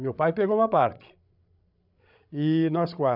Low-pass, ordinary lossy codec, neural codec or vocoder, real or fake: 5.4 kHz; none; codec, 16 kHz, 16 kbps, FreqCodec, larger model; fake